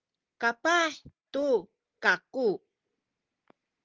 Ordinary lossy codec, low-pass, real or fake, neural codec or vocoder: Opus, 16 kbps; 7.2 kHz; real; none